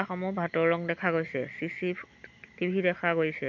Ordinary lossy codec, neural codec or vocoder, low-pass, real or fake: none; none; 7.2 kHz; real